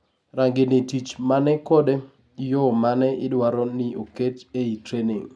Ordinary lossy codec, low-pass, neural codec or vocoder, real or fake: none; none; none; real